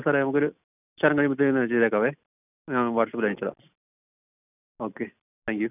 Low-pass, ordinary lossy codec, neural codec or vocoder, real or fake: 3.6 kHz; none; none; real